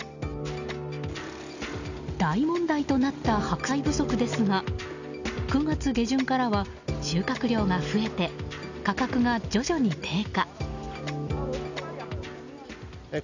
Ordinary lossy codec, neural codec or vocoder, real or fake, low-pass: none; none; real; 7.2 kHz